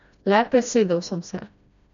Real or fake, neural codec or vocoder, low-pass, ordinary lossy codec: fake; codec, 16 kHz, 1 kbps, FreqCodec, smaller model; 7.2 kHz; none